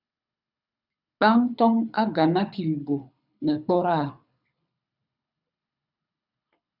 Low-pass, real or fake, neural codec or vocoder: 5.4 kHz; fake; codec, 24 kHz, 6 kbps, HILCodec